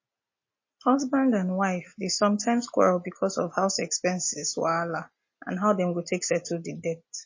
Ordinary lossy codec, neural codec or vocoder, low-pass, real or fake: MP3, 32 kbps; none; 7.2 kHz; real